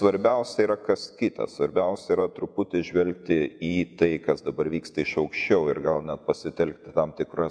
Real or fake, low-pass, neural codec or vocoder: fake; 9.9 kHz; vocoder, 48 kHz, 128 mel bands, Vocos